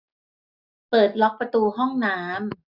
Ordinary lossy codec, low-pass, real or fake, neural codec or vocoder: none; 5.4 kHz; real; none